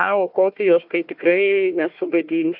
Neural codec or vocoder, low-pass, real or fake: codec, 16 kHz, 1 kbps, FunCodec, trained on Chinese and English, 50 frames a second; 5.4 kHz; fake